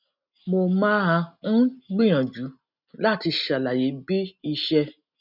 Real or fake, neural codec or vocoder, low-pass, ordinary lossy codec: real; none; 5.4 kHz; none